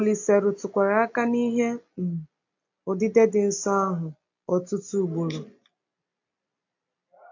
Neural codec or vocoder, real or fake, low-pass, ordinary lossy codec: none; real; 7.2 kHz; AAC, 48 kbps